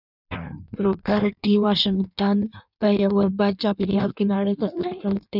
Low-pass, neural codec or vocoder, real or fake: 5.4 kHz; codec, 16 kHz in and 24 kHz out, 1.1 kbps, FireRedTTS-2 codec; fake